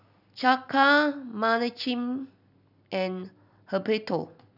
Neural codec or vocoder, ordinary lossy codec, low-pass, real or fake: none; none; 5.4 kHz; real